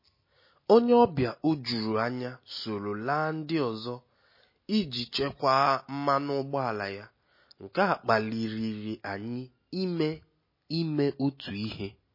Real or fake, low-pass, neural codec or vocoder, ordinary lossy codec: real; 5.4 kHz; none; MP3, 24 kbps